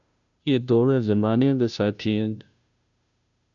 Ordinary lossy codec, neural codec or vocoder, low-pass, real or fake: AAC, 64 kbps; codec, 16 kHz, 0.5 kbps, FunCodec, trained on Chinese and English, 25 frames a second; 7.2 kHz; fake